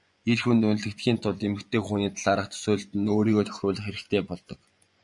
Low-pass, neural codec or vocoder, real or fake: 10.8 kHz; vocoder, 24 kHz, 100 mel bands, Vocos; fake